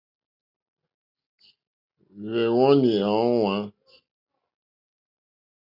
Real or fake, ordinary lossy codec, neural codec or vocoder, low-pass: real; Opus, 64 kbps; none; 5.4 kHz